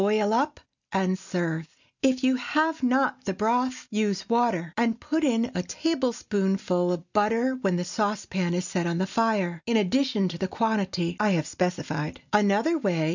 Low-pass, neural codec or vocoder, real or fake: 7.2 kHz; none; real